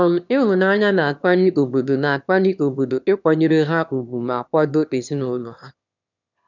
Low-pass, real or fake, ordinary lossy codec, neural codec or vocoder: 7.2 kHz; fake; none; autoencoder, 22.05 kHz, a latent of 192 numbers a frame, VITS, trained on one speaker